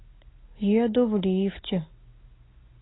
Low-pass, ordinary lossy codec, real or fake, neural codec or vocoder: 7.2 kHz; AAC, 16 kbps; real; none